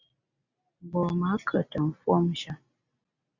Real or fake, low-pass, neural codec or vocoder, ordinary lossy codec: real; 7.2 kHz; none; Opus, 64 kbps